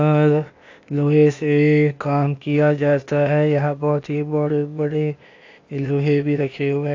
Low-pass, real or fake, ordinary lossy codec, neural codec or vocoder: 7.2 kHz; fake; AAC, 48 kbps; codec, 16 kHz, 0.8 kbps, ZipCodec